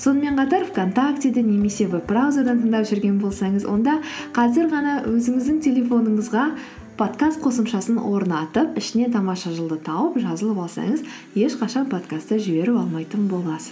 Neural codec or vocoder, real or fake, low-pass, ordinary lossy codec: none; real; none; none